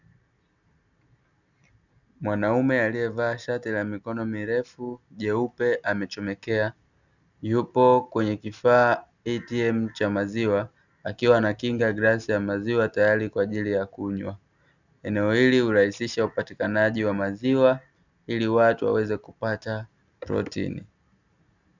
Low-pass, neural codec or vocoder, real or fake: 7.2 kHz; none; real